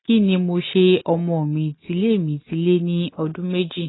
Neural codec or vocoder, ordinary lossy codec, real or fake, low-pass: none; AAC, 16 kbps; real; 7.2 kHz